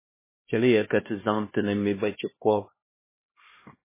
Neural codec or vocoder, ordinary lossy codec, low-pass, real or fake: codec, 16 kHz, 1 kbps, X-Codec, HuBERT features, trained on LibriSpeech; MP3, 16 kbps; 3.6 kHz; fake